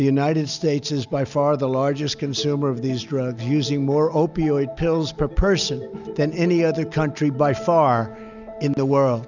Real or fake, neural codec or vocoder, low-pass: real; none; 7.2 kHz